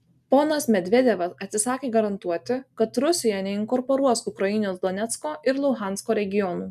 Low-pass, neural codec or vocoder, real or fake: 14.4 kHz; none; real